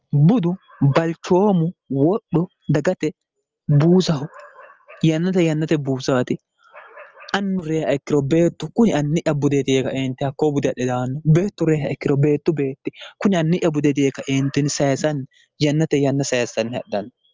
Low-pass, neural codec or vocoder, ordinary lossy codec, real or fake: 7.2 kHz; none; Opus, 24 kbps; real